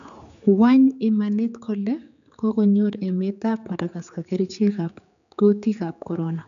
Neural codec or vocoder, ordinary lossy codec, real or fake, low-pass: codec, 16 kHz, 4 kbps, X-Codec, HuBERT features, trained on general audio; none; fake; 7.2 kHz